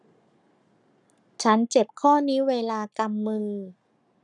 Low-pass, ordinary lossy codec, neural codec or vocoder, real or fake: 10.8 kHz; none; codec, 44.1 kHz, 7.8 kbps, Pupu-Codec; fake